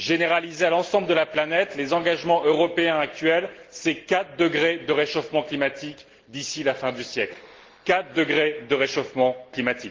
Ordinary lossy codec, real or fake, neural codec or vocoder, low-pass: Opus, 16 kbps; real; none; 7.2 kHz